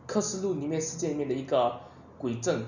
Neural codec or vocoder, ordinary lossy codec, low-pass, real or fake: none; none; 7.2 kHz; real